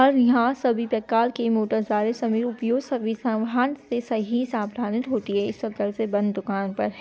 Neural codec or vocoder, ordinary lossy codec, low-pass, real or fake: none; none; none; real